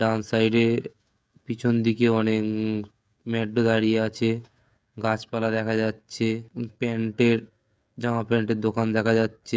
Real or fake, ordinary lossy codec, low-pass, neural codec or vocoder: fake; none; none; codec, 16 kHz, 16 kbps, FreqCodec, smaller model